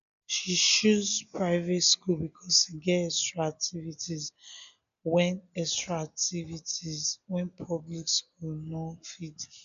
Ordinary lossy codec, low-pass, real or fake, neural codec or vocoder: none; 7.2 kHz; real; none